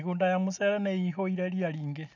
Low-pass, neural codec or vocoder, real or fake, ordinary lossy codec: 7.2 kHz; none; real; none